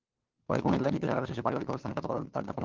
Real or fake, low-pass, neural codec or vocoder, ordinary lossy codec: fake; 7.2 kHz; codec, 16 kHz, 2 kbps, FunCodec, trained on LibriTTS, 25 frames a second; Opus, 16 kbps